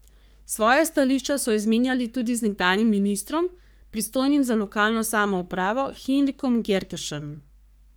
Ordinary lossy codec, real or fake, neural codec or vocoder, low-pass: none; fake; codec, 44.1 kHz, 3.4 kbps, Pupu-Codec; none